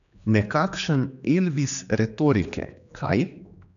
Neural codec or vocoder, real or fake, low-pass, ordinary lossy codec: codec, 16 kHz, 2 kbps, X-Codec, HuBERT features, trained on general audio; fake; 7.2 kHz; none